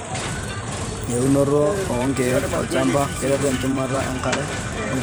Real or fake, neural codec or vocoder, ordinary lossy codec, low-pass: fake; vocoder, 44.1 kHz, 128 mel bands every 512 samples, BigVGAN v2; none; none